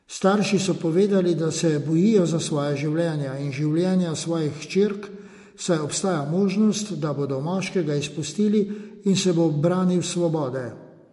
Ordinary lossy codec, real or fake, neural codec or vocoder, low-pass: MP3, 48 kbps; real; none; 14.4 kHz